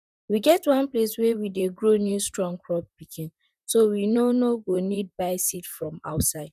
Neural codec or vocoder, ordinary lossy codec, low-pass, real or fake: vocoder, 44.1 kHz, 128 mel bands, Pupu-Vocoder; none; 14.4 kHz; fake